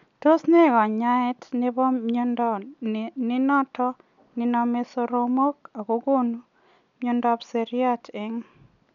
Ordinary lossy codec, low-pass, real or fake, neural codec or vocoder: none; 7.2 kHz; real; none